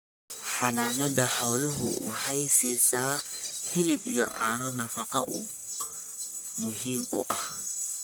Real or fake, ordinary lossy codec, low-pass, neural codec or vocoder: fake; none; none; codec, 44.1 kHz, 1.7 kbps, Pupu-Codec